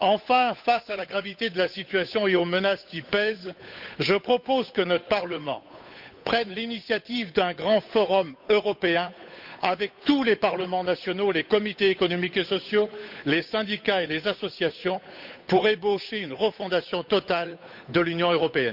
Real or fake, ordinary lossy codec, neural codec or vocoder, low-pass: fake; none; codec, 16 kHz, 8 kbps, FunCodec, trained on Chinese and English, 25 frames a second; 5.4 kHz